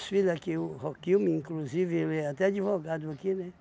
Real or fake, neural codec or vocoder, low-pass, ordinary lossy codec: real; none; none; none